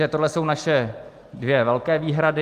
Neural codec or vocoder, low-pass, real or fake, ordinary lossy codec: none; 14.4 kHz; real; Opus, 32 kbps